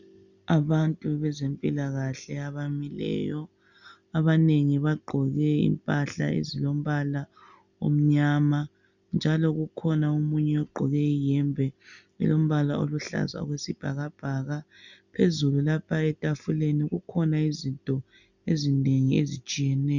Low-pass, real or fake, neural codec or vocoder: 7.2 kHz; real; none